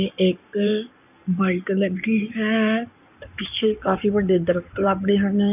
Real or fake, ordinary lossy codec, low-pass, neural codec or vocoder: fake; none; 3.6 kHz; codec, 16 kHz in and 24 kHz out, 2.2 kbps, FireRedTTS-2 codec